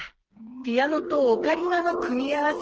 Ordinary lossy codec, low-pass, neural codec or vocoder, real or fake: Opus, 16 kbps; 7.2 kHz; codec, 16 kHz, 4 kbps, FreqCodec, smaller model; fake